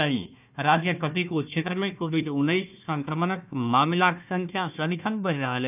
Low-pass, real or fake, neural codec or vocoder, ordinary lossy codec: 3.6 kHz; fake; codec, 16 kHz, 1 kbps, FunCodec, trained on Chinese and English, 50 frames a second; none